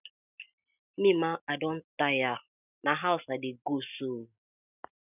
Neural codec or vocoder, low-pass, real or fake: none; 3.6 kHz; real